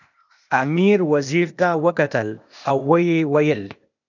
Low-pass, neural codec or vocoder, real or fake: 7.2 kHz; codec, 16 kHz, 0.8 kbps, ZipCodec; fake